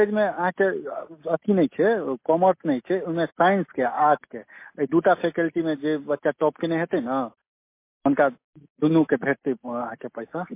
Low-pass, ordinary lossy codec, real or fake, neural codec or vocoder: 3.6 kHz; MP3, 24 kbps; real; none